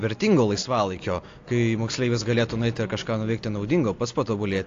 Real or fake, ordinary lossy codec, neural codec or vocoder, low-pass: real; AAC, 48 kbps; none; 7.2 kHz